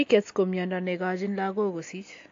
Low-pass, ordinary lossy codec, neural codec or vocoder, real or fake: 7.2 kHz; AAC, 48 kbps; none; real